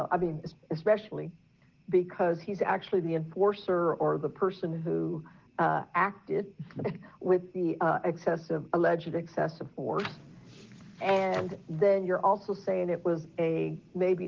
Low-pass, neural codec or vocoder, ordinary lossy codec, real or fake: 7.2 kHz; none; Opus, 16 kbps; real